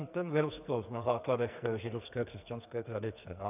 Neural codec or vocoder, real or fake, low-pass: codec, 44.1 kHz, 2.6 kbps, SNAC; fake; 3.6 kHz